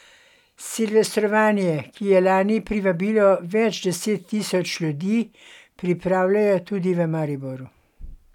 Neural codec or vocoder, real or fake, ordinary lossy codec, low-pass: none; real; none; 19.8 kHz